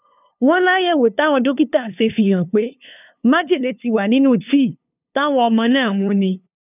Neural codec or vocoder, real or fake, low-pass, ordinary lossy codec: codec, 16 kHz, 2 kbps, FunCodec, trained on LibriTTS, 25 frames a second; fake; 3.6 kHz; none